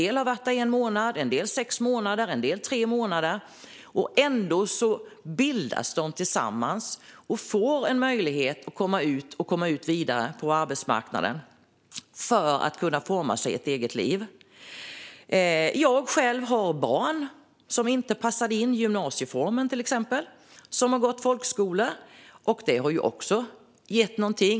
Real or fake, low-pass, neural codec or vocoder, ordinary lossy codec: real; none; none; none